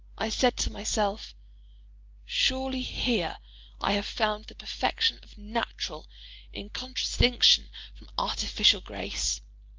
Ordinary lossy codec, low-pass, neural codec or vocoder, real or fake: Opus, 32 kbps; 7.2 kHz; none; real